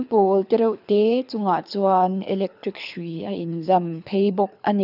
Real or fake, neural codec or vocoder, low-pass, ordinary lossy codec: fake; codec, 24 kHz, 6 kbps, HILCodec; 5.4 kHz; none